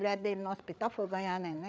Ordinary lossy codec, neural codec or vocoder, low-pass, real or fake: none; codec, 16 kHz, 8 kbps, FreqCodec, larger model; none; fake